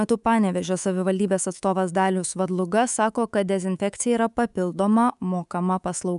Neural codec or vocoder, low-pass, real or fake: codec, 24 kHz, 3.1 kbps, DualCodec; 10.8 kHz; fake